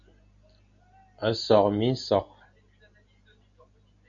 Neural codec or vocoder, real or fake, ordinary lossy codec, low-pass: none; real; MP3, 96 kbps; 7.2 kHz